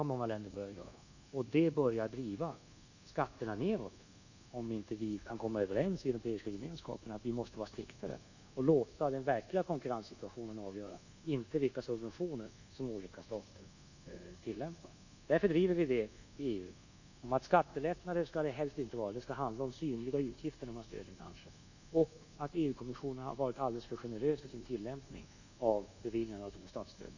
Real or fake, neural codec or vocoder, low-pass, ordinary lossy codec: fake; codec, 24 kHz, 1.2 kbps, DualCodec; 7.2 kHz; none